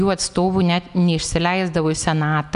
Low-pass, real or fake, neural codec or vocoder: 10.8 kHz; real; none